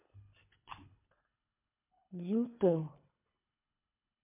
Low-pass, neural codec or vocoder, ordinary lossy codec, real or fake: 3.6 kHz; codec, 24 kHz, 3 kbps, HILCodec; MP3, 32 kbps; fake